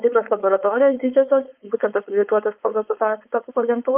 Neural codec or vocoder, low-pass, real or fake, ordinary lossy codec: codec, 16 kHz, 4.8 kbps, FACodec; 3.6 kHz; fake; Opus, 24 kbps